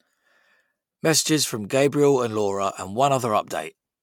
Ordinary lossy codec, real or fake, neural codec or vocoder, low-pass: MP3, 96 kbps; real; none; 19.8 kHz